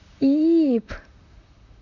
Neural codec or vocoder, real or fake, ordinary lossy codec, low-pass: vocoder, 44.1 kHz, 128 mel bands, Pupu-Vocoder; fake; none; 7.2 kHz